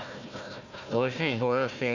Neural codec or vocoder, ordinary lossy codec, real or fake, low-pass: codec, 16 kHz, 1 kbps, FunCodec, trained on Chinese and English, 50 frames a second; none; fake; 7.2 kHz